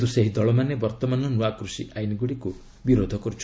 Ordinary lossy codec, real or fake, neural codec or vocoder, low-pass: none; real; none; none